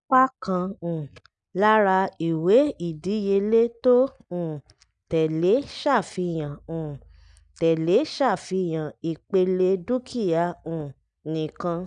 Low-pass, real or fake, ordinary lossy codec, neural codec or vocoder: 9.9 kHz; real; none; none